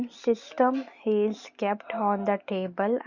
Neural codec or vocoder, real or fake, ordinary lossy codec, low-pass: none; real; Opus, 64 kbps; 7.2 kHz